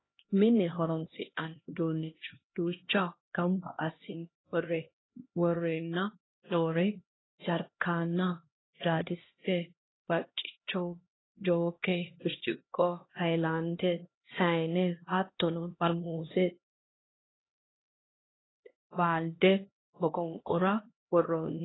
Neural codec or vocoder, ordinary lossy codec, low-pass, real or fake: codec, 16 kHz, 1 kbps, X-Codec, HuBERT features, trained on LibriSpeech; AAC, 16 kbps; 7.2 kHz; fake